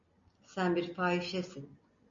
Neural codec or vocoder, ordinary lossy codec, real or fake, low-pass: none; MP3, 48 kbps; real; 7.2 kHz